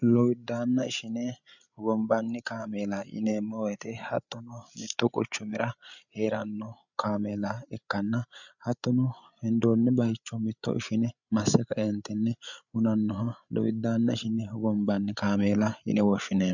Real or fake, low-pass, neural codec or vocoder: fake; 7.2 kHz; codec, 16 kHz, 8 kbps, FreqCodec, larger model